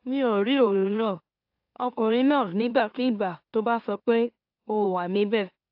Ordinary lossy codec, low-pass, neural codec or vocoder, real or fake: AAC, 48 kbps; 5.4 kHz; autoencoder, 44.1 kHz, a latent of 192 numbers a frame, MeloTTS; fake